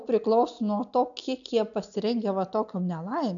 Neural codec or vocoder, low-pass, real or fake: none; 7.2 kHz; real